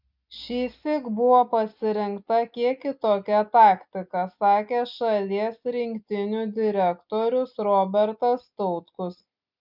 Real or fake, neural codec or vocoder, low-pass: real; none; 5.4 kHz